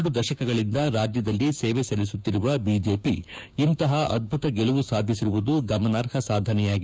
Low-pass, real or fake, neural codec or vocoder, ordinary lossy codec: none; fake; codec, 16 kHz, 6 kbps, DAC; none